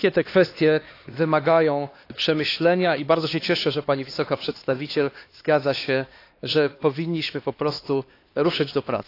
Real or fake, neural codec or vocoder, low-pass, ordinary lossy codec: fake; codec, 16 kHz, 2 kbps, X-Codec, HuBERT features, trained on LibriSpeech; 5.4 kHz; AAC, 32 kbps